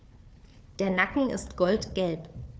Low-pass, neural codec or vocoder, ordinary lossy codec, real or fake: none; codec, 16 kHz, 4 kbps, FunCodec, trained on Chinese and English, 50 frames a second; none; fake